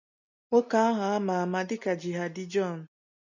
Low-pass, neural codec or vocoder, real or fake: 7.2 kHz; none; real